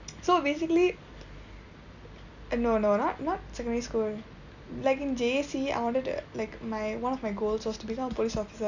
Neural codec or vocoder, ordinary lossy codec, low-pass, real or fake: none; none; 7.2 kHz; real